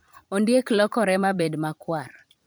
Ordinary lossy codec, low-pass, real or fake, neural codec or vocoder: none; none; fake; vocoder, 44.1 kHz, 128 mel bands every 512 samples, BigVGAN v2